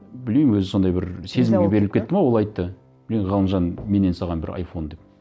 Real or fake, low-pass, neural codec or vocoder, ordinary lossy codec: real; none; none; none